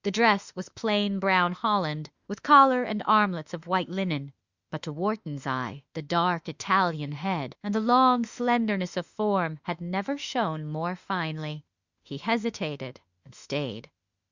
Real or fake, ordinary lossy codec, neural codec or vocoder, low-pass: fake; Opus, 64 kbps; autoencoder, 48 kHz, 32 numbers a frame, DAC-VAE, trained on Japanese speech; 7.2 kHz